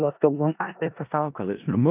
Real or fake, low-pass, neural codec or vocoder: fake; 3.6 kHz; codec, 16 kHz in and 24 kHz out, 0.4 kbps, LongCat-Audio-Codec, four codebook decoder